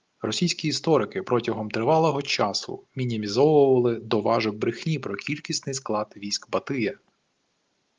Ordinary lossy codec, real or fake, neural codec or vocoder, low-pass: Opus, 24 kbps; real; none; 7.2 kHz